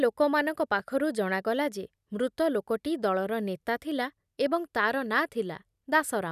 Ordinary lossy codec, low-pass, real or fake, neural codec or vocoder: none; 14.4 kHz; real; none